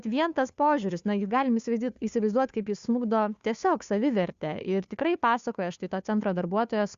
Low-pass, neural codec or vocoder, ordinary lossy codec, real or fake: 7.2 kHz; codec, 16 kHz, 2 kbps, FunCodec, trained on Chinese and English, 25 frames a second; Opus, 64 kbps; fake